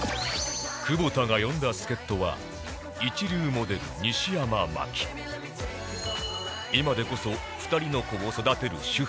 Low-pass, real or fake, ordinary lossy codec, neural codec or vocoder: none; real; none; none